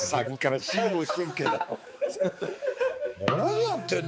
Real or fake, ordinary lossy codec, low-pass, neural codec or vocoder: fake; none; none; codec, 16 kHz, 4 kbps, X-Codec, HuBERT features, trained on balanced general audio